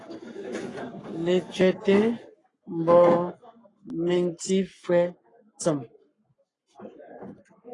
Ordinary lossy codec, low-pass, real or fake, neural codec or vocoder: AAC, 32 kbps; 10.8 kHz; fake; codec, 44.1 kHz, 7.8 kbps, Pupu-Codec